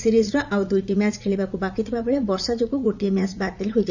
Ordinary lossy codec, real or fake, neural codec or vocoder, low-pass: none; fake; vocoder, 44.1 kHz, 80 mel bands, Vocos; 7.2 kHz